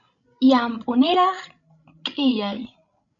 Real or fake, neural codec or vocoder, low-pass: fake; codec, 16 kHz, 16 kbps, FreqCodec, larger model; 7.2 kHz